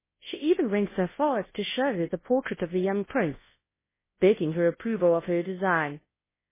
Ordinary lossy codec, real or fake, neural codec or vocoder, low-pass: MP3, 16 kbps; fake; codec, 24 kHz, 0.9 kbps, WavTokenizer, large speech release; 3.6 kHz